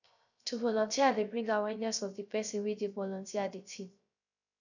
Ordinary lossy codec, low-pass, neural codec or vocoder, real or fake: none; 7.2 kHz; codec, 16 kHz, 0.3 kbps, FocalCodec; fake